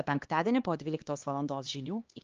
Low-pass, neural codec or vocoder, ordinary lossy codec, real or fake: 7.2 kHz; codec, 16 kHz, 2 kbps, X-Codec, HuBERT features, trained on LibriSpeech; Opus, 24 kbps; fake